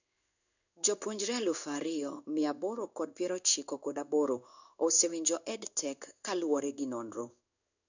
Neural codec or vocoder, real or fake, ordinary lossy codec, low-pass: codec, 16 kHz in and 24 kHz out, 1 kbps, XY-Tokenizer; fake; none; 7.2 kHz